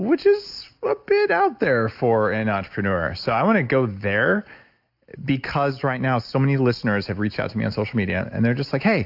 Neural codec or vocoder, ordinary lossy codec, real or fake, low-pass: none; AAC, 48 kbps; real; 5.4 kHz